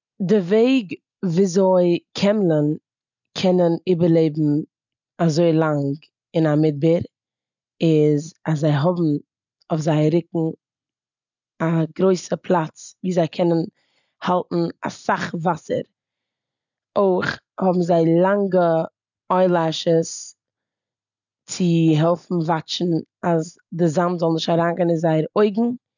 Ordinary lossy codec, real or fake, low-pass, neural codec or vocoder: none; real; 7.2 kHz; none